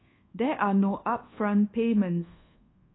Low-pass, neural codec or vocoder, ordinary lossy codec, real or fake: 7.2 kHz; codec, 24 kHz, 1.2 kbps, DualCodec; AAC, 16 kbps; fake